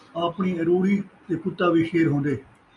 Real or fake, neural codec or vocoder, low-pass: real; none; 10.8 kHz